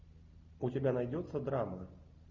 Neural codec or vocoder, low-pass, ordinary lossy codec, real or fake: none; 7.2 kHz; Opus, 64 kbps; real